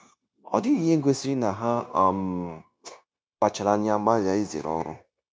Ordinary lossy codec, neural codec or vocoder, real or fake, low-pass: none; codec, 16 kHz, 0.9 kbps, LongCat-Audio-Codec; fake; none